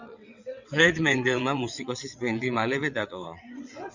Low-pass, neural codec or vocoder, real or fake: 7.2 kHz; vocoder, 22.05 kHz, 80 mel bands, WaveNeXt; fake